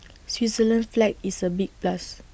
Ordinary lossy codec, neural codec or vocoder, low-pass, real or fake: none; none; none; real